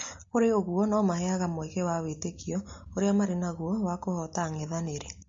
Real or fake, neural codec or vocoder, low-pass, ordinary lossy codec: real; none; 7.2 kHz; MP3, 32 kbps